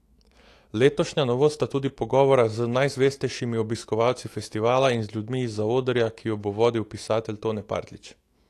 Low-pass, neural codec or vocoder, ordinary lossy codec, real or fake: 14.4 kHz; autoencoder, 48 kHz, 128 numbers a frame, DAC-VAE, trained on Japanese speech; AAC, 48 kbps; fake